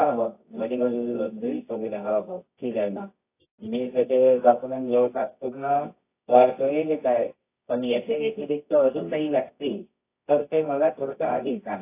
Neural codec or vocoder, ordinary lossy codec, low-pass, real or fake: codec, 24 kHz, 0.9 kbps, WavTokenizer, medium music audio release; AAC, 24 kbps; 3.6 kHz; fake